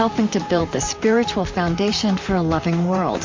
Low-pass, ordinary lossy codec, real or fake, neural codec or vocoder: 7.2 kHz; MP3, 48 kbps; fake; vocoder, 44.1 kHz, 128 mel bands, Pupu-Vocoder